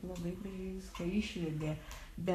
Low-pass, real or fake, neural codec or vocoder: 14.4 kHz; fake; codec, 44.1 kHz, 7.8 kbps, Pupu-Codec